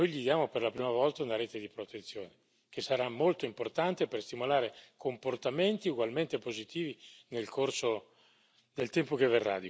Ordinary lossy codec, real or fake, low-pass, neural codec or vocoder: none; real; none; none